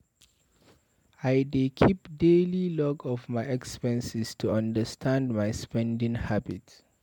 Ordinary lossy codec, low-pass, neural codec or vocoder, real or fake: MP3, 96 kbps; 19.8 kHz; none; real